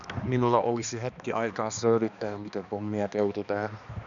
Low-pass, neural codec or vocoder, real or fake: 7.2 kHz; codec, 16 kHz, 2 kbps, X-Codec, HuBERT features, trained on balanced general audio; fake